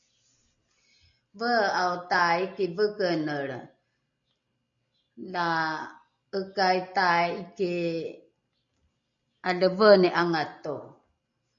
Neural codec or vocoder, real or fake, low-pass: none; real; 7.2 kHz